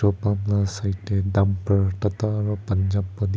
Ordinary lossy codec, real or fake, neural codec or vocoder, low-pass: none; real; none; none